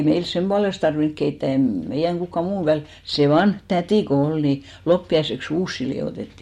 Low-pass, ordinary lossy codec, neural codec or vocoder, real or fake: 19.8 kHz; MP3, 64 kbps; none; real